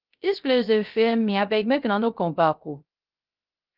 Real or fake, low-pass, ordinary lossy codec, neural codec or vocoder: fake; 5.4 kHz; Opus, 24 kbps; codec, 16 kHz, 0.3 kbps, FocalCodec